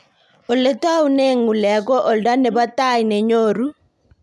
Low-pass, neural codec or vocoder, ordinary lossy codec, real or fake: none; none; none; real